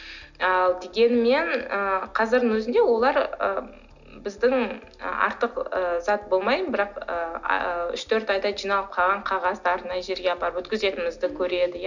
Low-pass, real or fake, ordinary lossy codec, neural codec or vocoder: 7.2 kHz; real; none; none